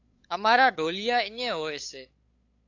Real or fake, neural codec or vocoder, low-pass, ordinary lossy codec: fake; codec, 16 kHz, 8 kbps, FunCodec, trained on LibriTTS, 25 frames a second; 7.2 kHz; AAC, 48 kbps